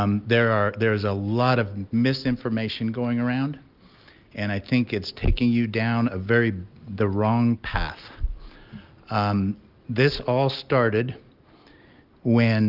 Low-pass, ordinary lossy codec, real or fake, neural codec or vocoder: 5.4 kHz; Opus, 32 kbps; real; none